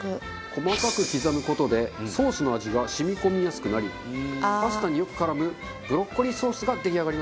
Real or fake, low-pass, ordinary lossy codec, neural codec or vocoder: real; none; none; none